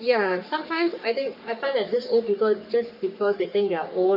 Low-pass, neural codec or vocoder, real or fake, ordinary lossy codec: 5.4 kHz; codec, 44.1 kHz, 3.4 kbps, Pupu-Codec; fake; none